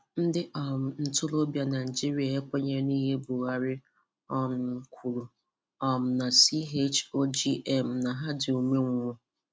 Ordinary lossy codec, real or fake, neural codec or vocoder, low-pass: none; real; none; none